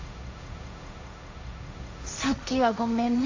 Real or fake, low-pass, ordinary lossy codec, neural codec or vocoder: fake; 7.2 kHz; AAC, 48 kbps; codec, 16 kHz, 1.1 kbps, Voila-Tokenizer